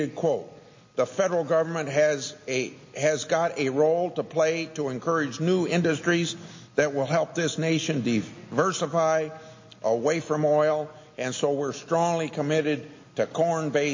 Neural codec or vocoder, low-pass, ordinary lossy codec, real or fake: none; 7.2 kHz; MP3, 32 kbps; real